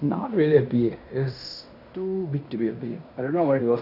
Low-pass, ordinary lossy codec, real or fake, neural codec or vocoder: 5.4 kHz; none; fake; codec, 16 kHz in and 24 kHz out, 0.9 kbps, LongCat-Audio-Codec, fine tuned four codebook decoder